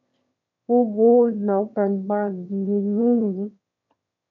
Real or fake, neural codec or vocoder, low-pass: fake; autoencoder, 22.05 kHz, a latent of 192 numbers a frame, VITS, trained on one speaker; 7.2 kHz